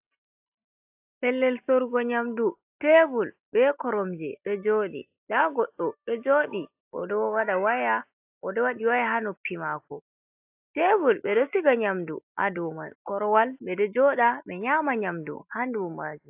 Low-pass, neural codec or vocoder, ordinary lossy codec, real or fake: 3.6 kHz; none; AAC, 32 kbps; real